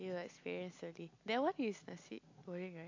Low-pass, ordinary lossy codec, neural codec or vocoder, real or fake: 7.2 kHz; AAC, 48 kbps; none; real